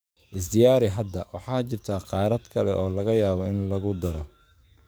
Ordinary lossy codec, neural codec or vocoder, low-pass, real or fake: none; codec, 44.1 kHz, 7.8 kbps, DAC; none; fake